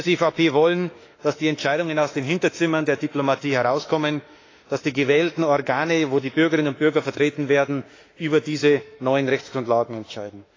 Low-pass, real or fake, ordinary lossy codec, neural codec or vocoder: 7.2 kHz; fake; AAC, 32 kbps; autoencoder, 48 kHz, 32 numbers a frame, DAC-VAE, trained on Japanese speech